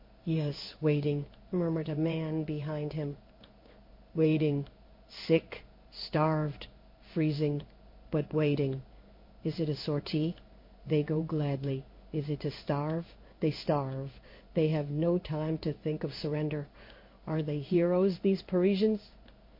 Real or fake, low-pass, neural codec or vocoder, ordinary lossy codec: fake; 5.4 kHz; codec, 16 kHz in and 24 kHz out, 1 kbps, XY-Tokenizer; MP3, 32 kbps